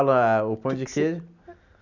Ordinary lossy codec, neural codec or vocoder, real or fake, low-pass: none; none; real; 7.2 kHz